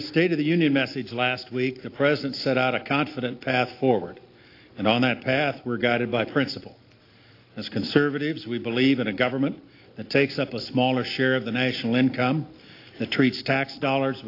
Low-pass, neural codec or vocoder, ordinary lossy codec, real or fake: 5.4 kHz; none; AAC, 32 kbps; real